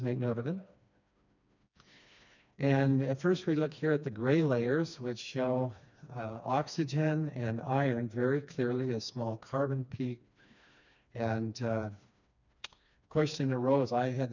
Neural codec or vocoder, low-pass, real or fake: codec, 16 kHz, 2 kbps, FreqCodec, smaller model; 7.2 kHz; fake